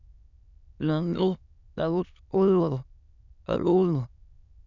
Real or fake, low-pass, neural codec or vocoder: fake; 7.2 kHz; autoencoder, 22.05 kHz, a latent of 192 numbers a frame, VITS, trained on many speakers